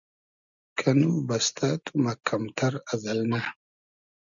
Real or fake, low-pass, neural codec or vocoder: real; 7.2 kHz; none